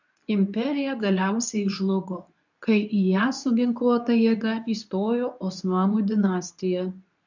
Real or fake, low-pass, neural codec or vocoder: fake; 7.2 kHz; codec, 24 kHz, 0.9 kbps, WavTokenizer, medium speech release version 2